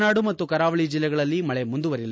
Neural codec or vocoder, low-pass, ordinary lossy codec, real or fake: none; none; none; real